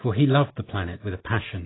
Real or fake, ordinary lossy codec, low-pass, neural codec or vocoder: real; AAC, 16 kbps; 7.2 kHz; none